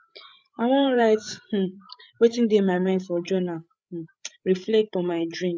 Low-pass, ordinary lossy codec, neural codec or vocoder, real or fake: none; none; codec, 16 kHz, 8 kbps, FreqCodec, larger model; fake